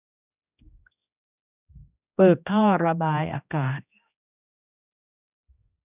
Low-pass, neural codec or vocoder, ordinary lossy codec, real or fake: 3.6 kHz; codec, 16 kHz, 1 kbps, X-Codec, HuBERT features, trained on general audio; none; fake